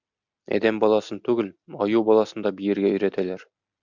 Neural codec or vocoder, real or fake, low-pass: none; real; 7.2 kHz